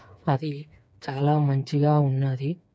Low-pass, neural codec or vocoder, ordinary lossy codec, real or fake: none; codec, 16 kHz, 4 kbps, FreqCodec, smaller model; none; fake